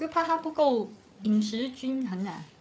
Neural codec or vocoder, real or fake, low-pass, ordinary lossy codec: codec, 16 kHz, 8 kbps, FreqCodec, larger model; fake; none; none